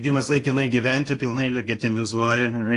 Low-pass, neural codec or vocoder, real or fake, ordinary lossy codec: 10.8 kHz; codec, 16 kHz in and 24 kHz out, 0.8 kbps, FocalCodec, streaming, 65536 codes; fake; AAC, 48 kbps